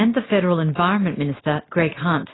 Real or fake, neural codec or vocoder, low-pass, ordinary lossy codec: real; none; 7.2 kHz; AAC, 16 kbps